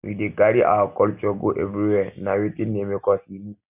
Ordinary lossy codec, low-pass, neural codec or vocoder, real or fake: none; 3.6 kHz; none; real